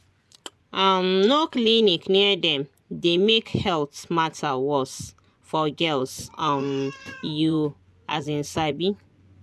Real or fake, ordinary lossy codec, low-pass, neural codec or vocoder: fake; none; none; vocoder, 24 kHz, 100 mel bands, Vocos